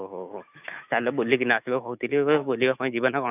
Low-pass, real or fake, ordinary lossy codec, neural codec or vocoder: 3.6 kHz; fake; none; autoencoder, 48 kHz, 128 numbers a frame, DAC-VAE, trained on Japanese speech